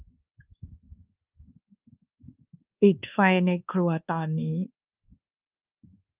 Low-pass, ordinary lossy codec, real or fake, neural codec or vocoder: 3.6 kHz; Opus, 24 kbps; fake; codec, 24 kHz, 1.2 kbps, DualCodec